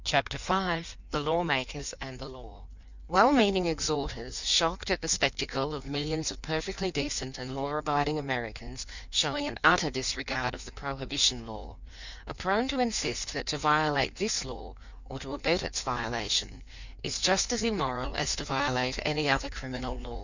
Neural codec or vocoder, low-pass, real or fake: codec, 16 kHz in and 24 kHz out, 1.1 kbps, FireRedTTS-2 codec; 7.2 kHz; fake